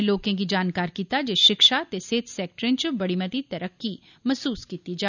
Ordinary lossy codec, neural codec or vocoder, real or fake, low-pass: none; none; real; 7.2 kHz